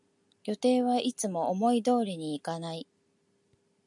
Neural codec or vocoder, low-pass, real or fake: none; 10.8 kHz; real